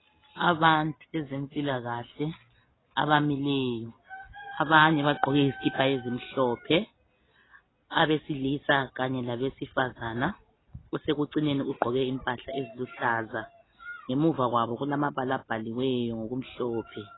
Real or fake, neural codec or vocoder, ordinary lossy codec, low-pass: real; none; AAC, 16 kbps; 7.2 kHz